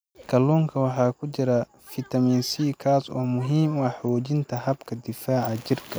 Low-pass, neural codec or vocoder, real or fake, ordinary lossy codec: none; none; real; none